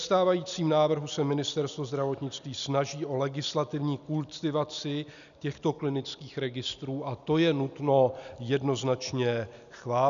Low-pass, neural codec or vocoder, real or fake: 7.2 kHz; none; real